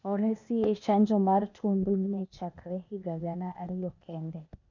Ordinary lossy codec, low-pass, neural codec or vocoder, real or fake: none; 7.2 kHz; codec, 16 kHz, 0.8 kbps, ZipCodec; fake